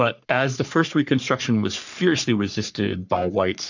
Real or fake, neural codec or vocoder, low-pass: fake; codec, 44.1 kHz, 3.4 kbps, Pupu-Codec; 7.2 kHz